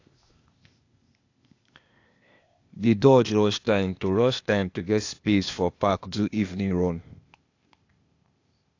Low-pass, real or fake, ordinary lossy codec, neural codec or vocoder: 7.2 kHz; fake; AAC, 48 kbps; codec, 16 kHz, 0.8 kbps, ZipCodec